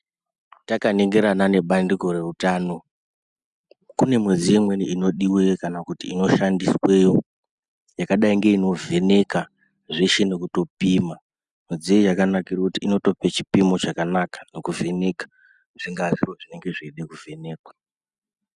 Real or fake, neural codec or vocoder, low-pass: real; none; 10.8 kHz